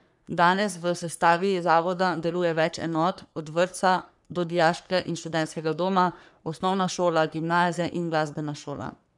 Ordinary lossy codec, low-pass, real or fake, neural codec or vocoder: none; 10.8 kHz; fake; codec, 44.1 kHz, 3.4 kbps, Pupu-Codec